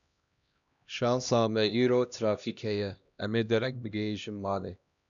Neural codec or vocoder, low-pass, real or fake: codec, 16 kHz, 1 kbps, X-Codec, HuBERT features, trained on LibriSpeech; 7.2 kHz; fake